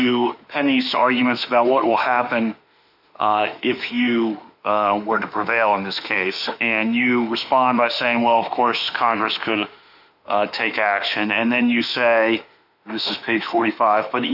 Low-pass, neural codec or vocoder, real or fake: 5.4 kHz; autoencoder, 48 kHz, 32 numbers a frame, DAC-VAE, trained on Japanese speech; fake